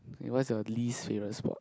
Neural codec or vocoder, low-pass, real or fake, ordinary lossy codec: none; none; real; none